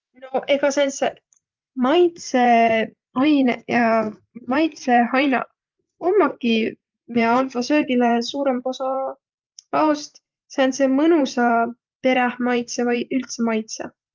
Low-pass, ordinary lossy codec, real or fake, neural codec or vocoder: 7.2 kHz; Opus, 24 kbps; fake; vocoder, 44.1 kHz, 128 mel bands every 512 samples, BigVGAN v2